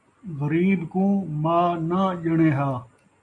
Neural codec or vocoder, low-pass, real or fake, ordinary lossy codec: none; 10.8 kHz; real; AAC, 64 kbps